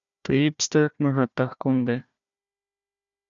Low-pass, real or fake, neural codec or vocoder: 7.2 kHz; fake; codec, 16 kHz, 1 kbps, FunCodec, trained on Chinese and English, 50 frames a second